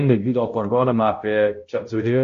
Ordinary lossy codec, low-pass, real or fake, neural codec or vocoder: AAC, 48 kbps; 7.2 kHz; fake; codec, 16 kHz, 0.5 kbps, X-Codec, HuBERT features, trained on balanced general audio